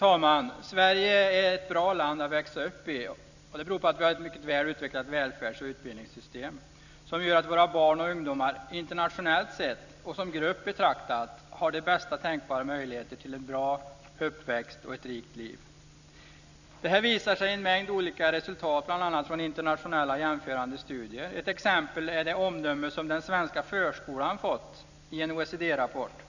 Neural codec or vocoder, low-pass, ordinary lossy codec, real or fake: none; 7.2 kHz; none; real